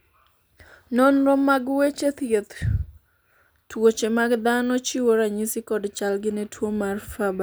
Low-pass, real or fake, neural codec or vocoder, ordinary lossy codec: none; real; none; none